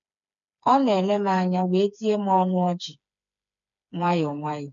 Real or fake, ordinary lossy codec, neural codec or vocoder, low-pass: fake; none; codec, 16 kHz, 4 kbps, FreqCodec, smaller model; 7.2 kHz